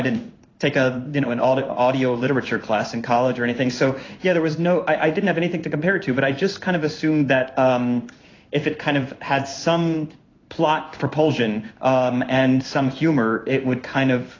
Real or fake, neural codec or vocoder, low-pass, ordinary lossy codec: fake; codec, 16 kHz in and 24 kHz out, 1 kbps, XY-Tokenizer; 7.2 kHz; AAC, 32 kbps